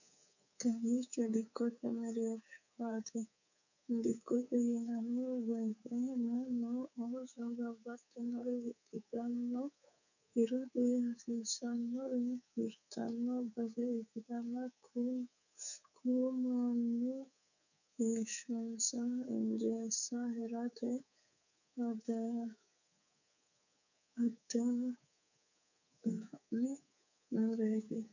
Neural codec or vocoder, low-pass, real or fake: codec, 24 kHz, 3.1 kbps, DualCodec; 7.2 kHz; fake